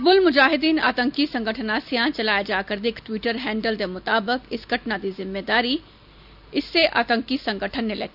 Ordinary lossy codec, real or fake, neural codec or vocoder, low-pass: none; real; none; 5.4 kHz